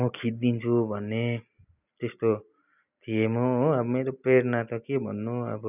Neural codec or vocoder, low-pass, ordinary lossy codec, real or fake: none; 3.6 kHz; none; real